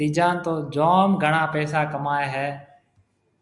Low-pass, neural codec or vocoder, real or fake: 10.8 kHz; none; real